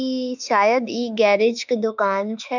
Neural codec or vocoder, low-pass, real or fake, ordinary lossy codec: autoencoder, 48 kHz, 32 numbers a frame, DAC-VAE, trained on Japanese speech; 7.2 kHz; fake; none